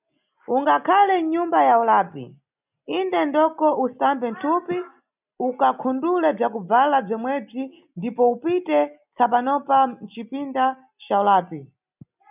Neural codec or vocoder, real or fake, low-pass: none; real; 3.6 kHz